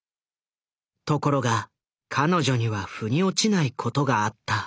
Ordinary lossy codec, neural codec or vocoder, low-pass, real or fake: none; none; none; real